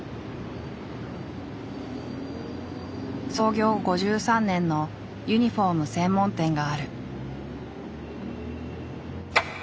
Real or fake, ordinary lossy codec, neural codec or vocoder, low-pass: real; none; none; none